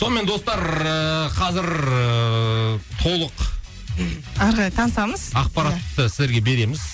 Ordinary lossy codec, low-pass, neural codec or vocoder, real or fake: none; none; none; real